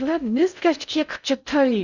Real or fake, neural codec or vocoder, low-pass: fake; codec, 16 kHz in and 24 kHz out, 0.6 kbps, FocalCodec, streaming, 2048 codes; 7.2 kHz